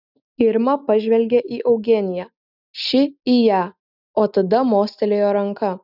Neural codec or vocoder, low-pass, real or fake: none; 5.4 kHz; real